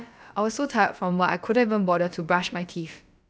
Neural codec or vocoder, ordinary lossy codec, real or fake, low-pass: codec, 16 kHz, about 1 kbps, DyCAST, with the encoder's durations; none; fake; none